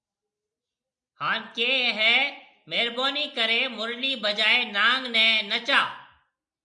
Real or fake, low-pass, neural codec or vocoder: real; 9.9 kHz; none